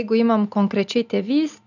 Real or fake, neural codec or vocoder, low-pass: real; none; 7.2 kHz